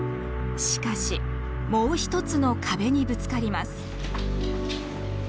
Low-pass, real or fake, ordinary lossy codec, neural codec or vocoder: none; real; none; none